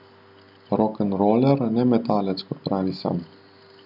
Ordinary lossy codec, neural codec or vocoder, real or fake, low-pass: none; none; real; 5.4 kHz